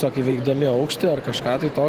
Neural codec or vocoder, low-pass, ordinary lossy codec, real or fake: vocoder, 44.1 kHz, 128 mel bands every 512 samples, BigVGAN v2; 14.4 kHz; Opus, 16 kbps; fake